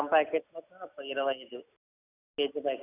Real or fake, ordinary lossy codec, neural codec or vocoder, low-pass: real; none; none; 3.6 kHz